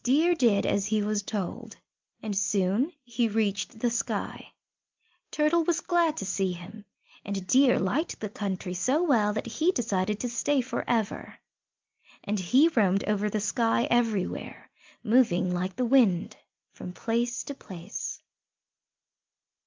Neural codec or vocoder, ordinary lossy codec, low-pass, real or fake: none; Opus, 24 kbps; 7.2 kHz; real